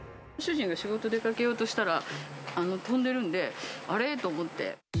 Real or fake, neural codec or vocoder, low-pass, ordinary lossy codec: real; none; none; none